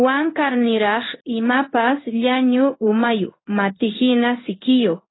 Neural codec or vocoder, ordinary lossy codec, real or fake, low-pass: codec, 16 kHz in and 24 kHz out, 1 kbps, XY-Tokenizer; AAC, 16 kbps; fake; 7.2 kHz